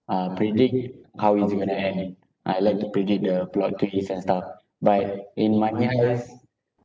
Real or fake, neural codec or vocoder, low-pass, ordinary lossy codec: real; none; none; none